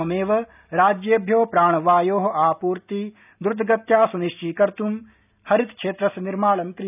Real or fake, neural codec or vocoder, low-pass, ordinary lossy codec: real; none; 3.6 kHz; none